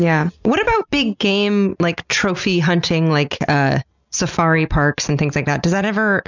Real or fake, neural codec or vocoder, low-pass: real; none; 7.2 kHz